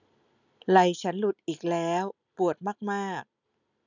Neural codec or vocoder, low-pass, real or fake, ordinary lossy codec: none; 7.2 kHz; real; none